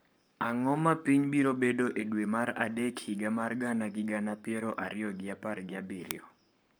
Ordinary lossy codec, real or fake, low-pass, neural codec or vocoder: none; fake; none; codec, 44.1 kHz, 7.8 kbps, Pupu-Codec